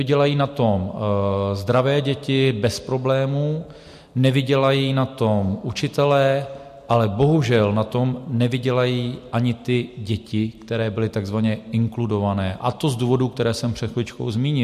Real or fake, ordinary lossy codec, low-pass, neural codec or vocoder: real; MP3, 64 kbps; 14.4 kHz; none